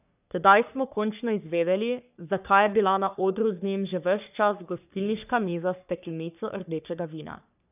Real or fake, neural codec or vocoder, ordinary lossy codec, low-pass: fake; codec, 44.1 kHz, 3.4 kbps, Pupu-Codec; none; 3.6 kHz